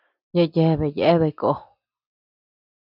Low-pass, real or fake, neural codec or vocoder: 5.4 kHz; real; none